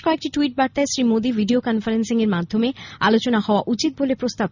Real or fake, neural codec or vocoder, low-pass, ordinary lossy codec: real; none; 7.2 kHz; none